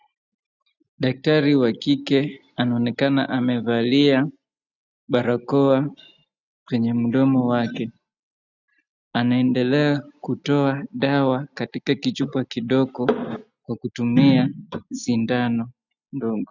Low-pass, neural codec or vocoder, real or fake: 7.2 kHz; none; real